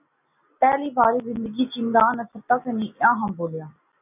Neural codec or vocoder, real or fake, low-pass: none; real; 3.6 kHz